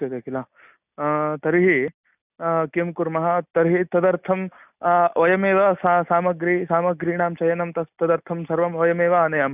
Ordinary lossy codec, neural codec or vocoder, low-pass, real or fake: none; none; 3.6 kHz; real